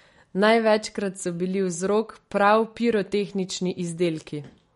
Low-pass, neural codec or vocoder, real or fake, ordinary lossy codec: 19.8 kHz; none; real; MP3, 48 kbps